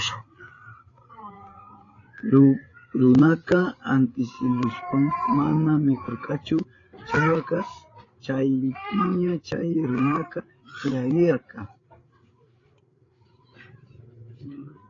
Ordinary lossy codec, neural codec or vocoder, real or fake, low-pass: AAC, 32 kbps; codec, 16 kHz, 8 kbps, FreqCodec, larger model; fake; 7.2 kHz